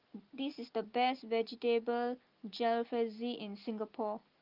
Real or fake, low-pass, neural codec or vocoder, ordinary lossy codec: real; 5.4 kHz; none; Opus, 32 kbps